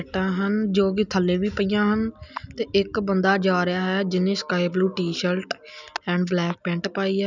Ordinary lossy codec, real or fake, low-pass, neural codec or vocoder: none; real; 7.2 kHz; none